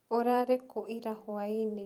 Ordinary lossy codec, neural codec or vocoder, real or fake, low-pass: Opus, 32 kbps; vocoder, 44.1 kHz, 128 mel bands every 512 samples, BigVGAN v2; fake; 19.8 kHz